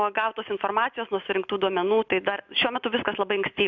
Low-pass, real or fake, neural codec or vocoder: 7.2 kHz; real; none